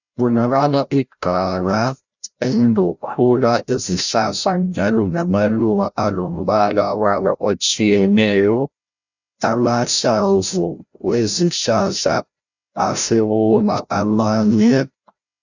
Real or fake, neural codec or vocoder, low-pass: fake; codec, 16 kHz, 0.5 kbps, FreqCodec, larger model; 7.2 kHz